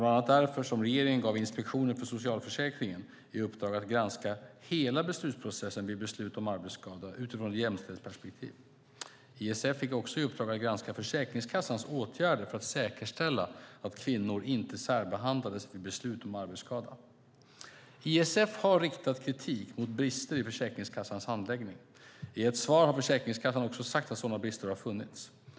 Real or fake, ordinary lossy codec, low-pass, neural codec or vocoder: real; none; none; none